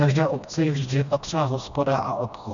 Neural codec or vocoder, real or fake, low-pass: codec, 16 kHz, 1 kbps, FreqCodec, smaller model; fake; 7.2 kHz